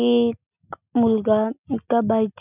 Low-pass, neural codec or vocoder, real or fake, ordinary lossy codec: 3.6 kHz; none; real; none